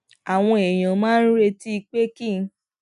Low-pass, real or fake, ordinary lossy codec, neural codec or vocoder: 10.8 kHz; real; none; none